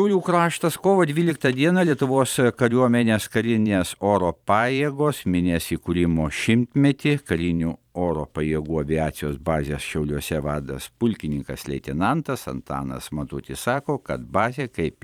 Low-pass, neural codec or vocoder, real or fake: 19.8 kHz; vocoder, 44.1 kHz, 128 mel bands every 512 samples, BigVGAN v2; fake